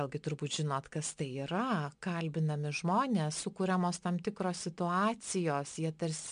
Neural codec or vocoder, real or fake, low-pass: none; real; 9.9 kHz